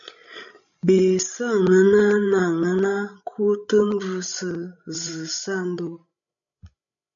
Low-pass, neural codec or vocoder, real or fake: 7.2 kHz; codec, 16 kHz, 16 kbps, FreqCodec, larger model; fake